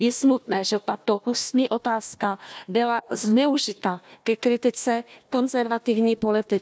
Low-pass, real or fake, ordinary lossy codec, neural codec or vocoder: none; fake; none; codec, 16 kHz, 1 kbps, FunCodec, trained on Chinese and English, 50 frames a second